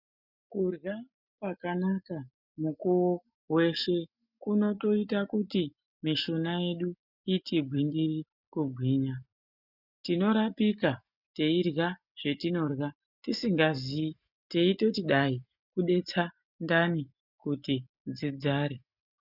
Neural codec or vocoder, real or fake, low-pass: none; real; 5.4 kHz